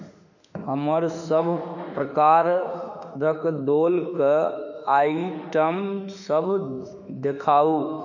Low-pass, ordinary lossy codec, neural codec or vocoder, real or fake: 7.2 kHz; none; autoencoder, 48 kHz, 32 numbers a frame, DAC-VAE, trained on Japanese speech; fake